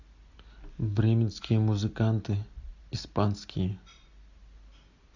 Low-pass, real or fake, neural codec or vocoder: 7.2 kHz; real; none